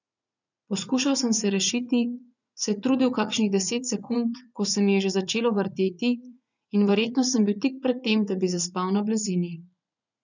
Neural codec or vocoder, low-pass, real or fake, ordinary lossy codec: vocoder, 44.1 kHz, 80 mel bands, Vocos; 7.2 kHz; fake; none